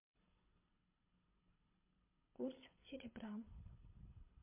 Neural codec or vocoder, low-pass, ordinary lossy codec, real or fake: codec, 24 kHz, 6 kbps, HILCodec; 3.6 kHz; MP3, 24 kbps; fake